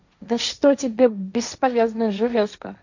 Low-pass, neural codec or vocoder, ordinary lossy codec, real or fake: 7.2 kHz; codec, 16 kHz, 1.1 kbps, Voila-Tokenizer; none; fake